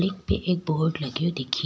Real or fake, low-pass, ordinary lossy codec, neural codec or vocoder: real; none; none; none